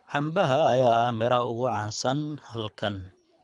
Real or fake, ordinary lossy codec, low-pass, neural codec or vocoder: fake; MP3, 96 kbps; 10.8 kHz; codec, 24 kHz, 3 kbps, HILCodec